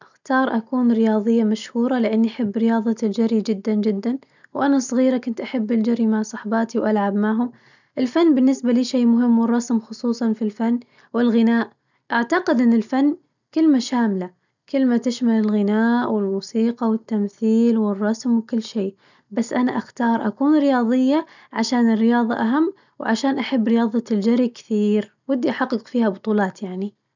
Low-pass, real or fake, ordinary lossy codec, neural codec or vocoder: 7.2 kHz; real; none; none